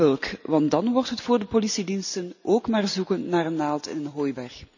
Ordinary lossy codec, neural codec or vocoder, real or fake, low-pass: none; none; real; 7.2 kHz